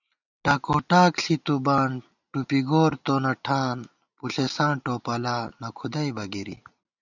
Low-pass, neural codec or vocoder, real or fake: 7.2 kHz; none; real